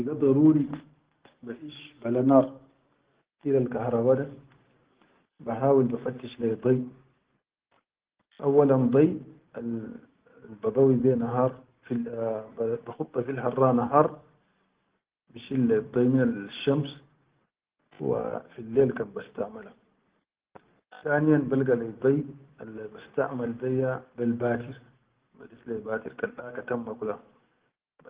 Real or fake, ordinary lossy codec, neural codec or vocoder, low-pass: real; Opus, 16 kbps; none; 3.6 kHz